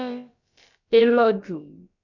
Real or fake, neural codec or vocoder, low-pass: fake; codec, 16 kHz, about 1 kbps, DyCAST, with the encoder's durations; 7.2 kHz